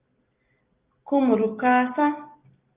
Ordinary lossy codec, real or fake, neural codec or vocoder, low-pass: Opus, 32 kbps; real; none; 3.6 kHz